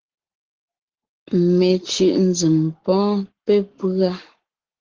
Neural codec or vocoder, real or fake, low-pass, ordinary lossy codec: codec, 44.1 kHz, 7.8 kbps, Pupu-Codec; fake; 7.2 kHz; Opus, 16 kbps